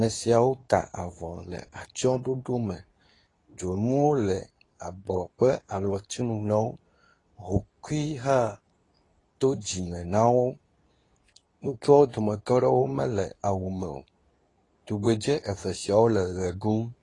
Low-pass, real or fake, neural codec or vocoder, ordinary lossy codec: 10.8 kHz; fake; codec, 24 kHz, 0.9 kbps, WavTokenizer, medium speech release version 2; AAC, 32 kbps